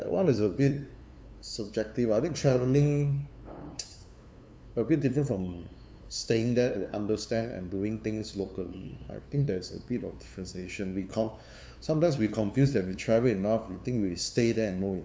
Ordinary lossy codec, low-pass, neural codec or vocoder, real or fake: none; none; codec, 16 kHz, 2 kbps, FunCodec, trained on LibriTTS, 25 frames a second; fake